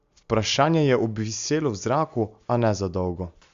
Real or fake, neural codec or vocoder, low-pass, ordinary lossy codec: real; none; 7.2 kHz; Opus, 64 kbps